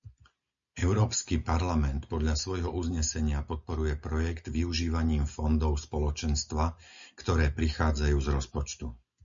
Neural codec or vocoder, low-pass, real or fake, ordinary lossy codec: none; 7.2 kHz; real; MP3, 64 kbps